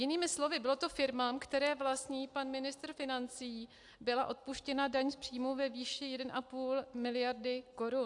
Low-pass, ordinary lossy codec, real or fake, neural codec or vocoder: 10.8 kHz; AAC, 64 kbps; real; none